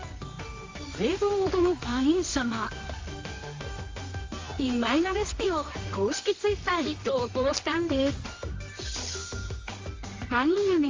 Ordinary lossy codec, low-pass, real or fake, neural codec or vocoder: Opus, 32 kbps; 7.2 kHz; fake; codec, 24 kHz, 0.9 kbps, WavTokenizer, medium music audio release